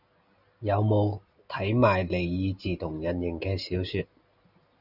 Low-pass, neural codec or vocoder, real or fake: 5.4 kHz; none; real